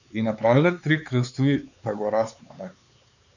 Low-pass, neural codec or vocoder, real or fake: 7.2 kHz; codec, 16 kHz, 4 kbps, X-Codec, HuBERT features, trained on LibriSpeech; fake